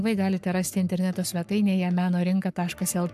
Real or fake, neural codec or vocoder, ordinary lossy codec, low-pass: fake; codec, 44.1 kHz, 7.8 kbps, Pupu-Codec; AAC, 96 kbps; 14.4 kHz